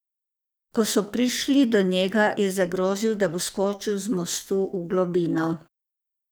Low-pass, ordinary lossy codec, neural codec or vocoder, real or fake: none; none; codec, 44.1 kHz, 2.6 kbps, SNAC; fake